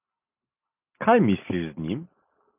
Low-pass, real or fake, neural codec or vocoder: 3.6 kHz; real; none